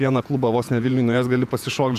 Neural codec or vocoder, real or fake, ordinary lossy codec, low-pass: vocoder, 48 kHz, 128 mel bands, Vocos; fake; AAC, 96 kbps; 14.4 kHz